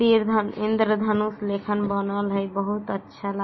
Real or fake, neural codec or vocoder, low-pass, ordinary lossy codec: real; none; 7.2 kHz; MP3, 24 kbps